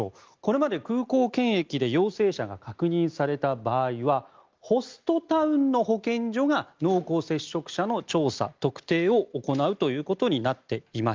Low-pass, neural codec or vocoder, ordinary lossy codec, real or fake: 7.2 kHz; none; Opus, 24 kbps; real